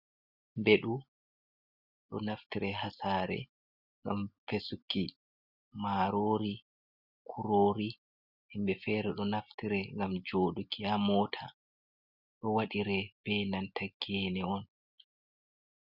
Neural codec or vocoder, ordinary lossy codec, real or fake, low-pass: none; Opus, 64 kbps; real; 5.4 kHz